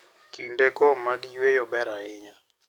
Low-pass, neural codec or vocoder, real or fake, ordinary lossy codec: 19.8 kHz; codec, 44.1 kHz, 7.8 kbps, DAC; fake; none